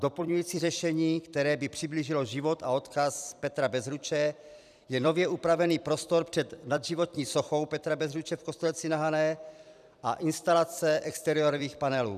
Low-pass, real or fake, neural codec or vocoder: 14.4 kHz; fake; vocoder, 44.1 kHz, 128 mel bands every 256 samples, BigVGAN v2